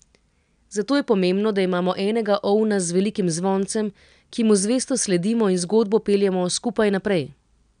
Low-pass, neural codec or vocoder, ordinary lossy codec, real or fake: 9.9 kHz; none; none; real